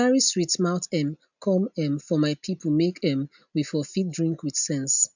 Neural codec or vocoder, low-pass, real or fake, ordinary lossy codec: none; 7.2 kHz; real; none